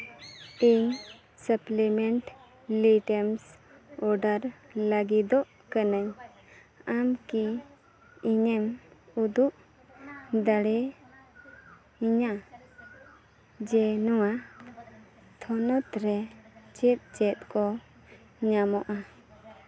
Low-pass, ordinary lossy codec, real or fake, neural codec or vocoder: none; none; real; none